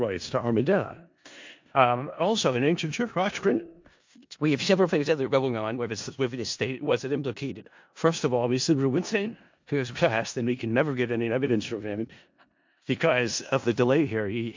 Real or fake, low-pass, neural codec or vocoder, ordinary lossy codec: fake; 7.2 kHz; codec, 16 kHz in and 24 kHz out, 0.4 kbps, LongCat-Audio-Codec, four codebook decoder; MP3, 48 kbps